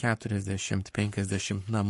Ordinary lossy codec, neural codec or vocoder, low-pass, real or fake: MP3, 48 kbps; codec, 44.1 kHz, 7.8 kbps, Pupu-Codec; 14.4 kHz; fake